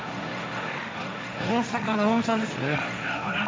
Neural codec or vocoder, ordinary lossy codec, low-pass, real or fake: codec, 16 kHz, 1.1 kbps, Voila-Tokenizer; none; none; fake